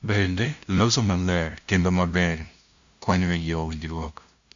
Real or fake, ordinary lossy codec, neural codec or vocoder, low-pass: fake; Opus, 64 kbps; codec, 16 kHz, 0.5 kbps, FunCodec, trained on LibriTTS, 25 frames a second; 7.2 kHz